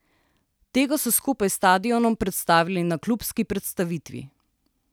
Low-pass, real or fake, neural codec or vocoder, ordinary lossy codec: none; real; none; none